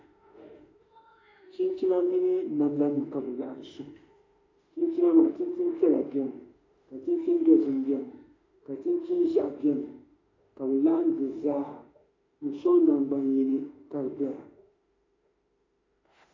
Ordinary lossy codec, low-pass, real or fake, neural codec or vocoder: AAC, 32 kbps; 7.2 kHz; fake; autoencoder, 48 kHz, 32 numbers a frame, DAC-VAE, trained on Japanese speech